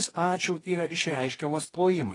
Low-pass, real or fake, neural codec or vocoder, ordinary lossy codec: 10.8 kHz; fake; codec, 24 kHz, 0.9 kbps, WavTokenizer, medium music audio release; AAC, 32 kbps